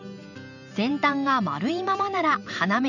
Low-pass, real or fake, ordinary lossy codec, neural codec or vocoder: 7.2 kHz; fake; none; vocoder, 44.1 kHz, 128 mel bands every 512 samples, BigVGAN v2